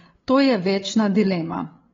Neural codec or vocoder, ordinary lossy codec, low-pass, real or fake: codec, 16 kHz, 8 kbps, FreqCodec, larger model; AAC, 24 kbps; 7.2 kHz; fake